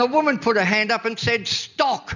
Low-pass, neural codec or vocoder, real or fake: 7.2 kHz; none; real